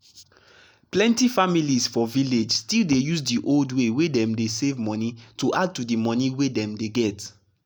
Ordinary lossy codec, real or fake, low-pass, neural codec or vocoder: none; real; none; none